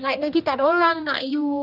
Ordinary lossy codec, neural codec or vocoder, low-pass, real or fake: none; codec, 16 kHz, 1 kbps, X-Codec, HuBERT features, trained on general audio; 5.4 kHz; fake